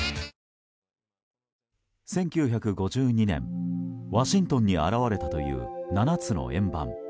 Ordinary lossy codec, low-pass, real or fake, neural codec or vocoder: none; none; real; none